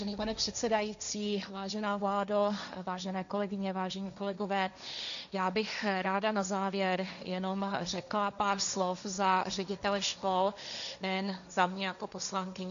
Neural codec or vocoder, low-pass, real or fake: codec, 16 kHz, 1.1 kbps, Voila-Tokenizer; 7.2 kHz; fake